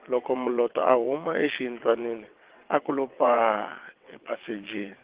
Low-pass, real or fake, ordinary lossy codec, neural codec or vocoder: 3.6 kHz; fake; Opus, 16 kbps; vocoder, 22.05 kHz, 80 mel bands, WaveNeXt